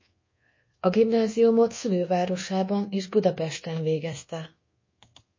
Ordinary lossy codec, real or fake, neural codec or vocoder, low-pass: MP3, 32 kbps; fake; codec, 24 kHz, 1.2 kbps, DualCodec; 7.2 kHz